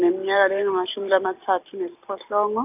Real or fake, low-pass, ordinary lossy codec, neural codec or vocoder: real; 3.6 kHz; none; none